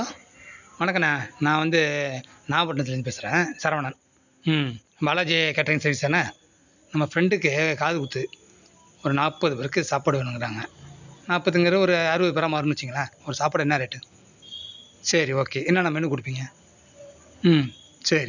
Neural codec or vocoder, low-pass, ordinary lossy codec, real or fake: none; 7.2 kHz; none; real